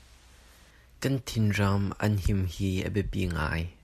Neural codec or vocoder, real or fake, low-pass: none; real; 14.4 kHz